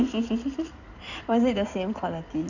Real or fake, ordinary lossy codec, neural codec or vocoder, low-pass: fake; none; codec, 16 kHz in and 24 kHz out, 2.2 kbps, FireRedTTS-2 codec; 7.2 kHz